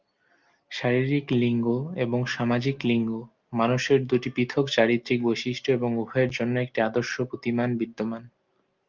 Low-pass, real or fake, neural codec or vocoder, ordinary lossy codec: 7.2 kHz; real; none; Opus, 24 kbps